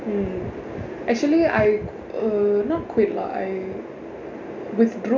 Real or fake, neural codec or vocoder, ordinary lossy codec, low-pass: real; none; none; 7.2 kHz